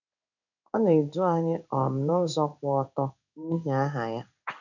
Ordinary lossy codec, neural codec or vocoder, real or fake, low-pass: none; codec, 16 kHz in and 24 kHz out, 1 kbps, XY-Tokenizer; fake; 7.2 kHz